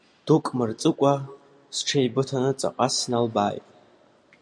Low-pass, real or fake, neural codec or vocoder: 9.9 kHz; real; none